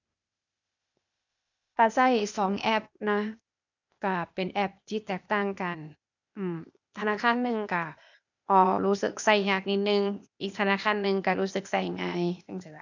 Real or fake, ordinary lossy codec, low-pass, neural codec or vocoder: fake; none; 7.2 kHz; codec, 16 kHz, 0.8 kbps, ZipCodec